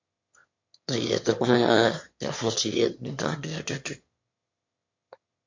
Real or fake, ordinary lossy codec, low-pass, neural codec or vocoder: fake; MP3, 48 kbps; 7.2 kHz; autoencoder, 22.05 kHz, a latent of 192 numbers a frame, VITS, trained on one speaker